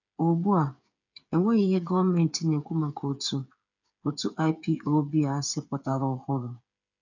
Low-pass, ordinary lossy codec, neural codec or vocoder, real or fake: 7.2 kHz; none; codec, 16 kHz, 8 kbps, FreqCodec, smaller model; fake